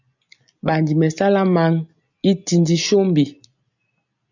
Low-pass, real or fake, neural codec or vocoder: 7.2 kHz; real; none